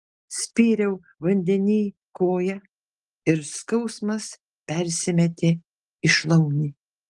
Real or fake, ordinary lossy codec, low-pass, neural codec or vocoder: real; Opus, 24 kbps; 10.8 kHz; none